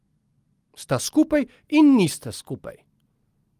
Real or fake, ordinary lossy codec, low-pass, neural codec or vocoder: real; Opus, 24 kbps; 14.4 kHz; none